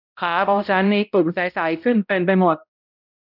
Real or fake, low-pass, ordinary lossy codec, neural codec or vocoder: fake; 5.4 kHz; none; codec, 16 kHz, 0.5 kbps, X-Codec, HuBERT features, trained on balanced general audio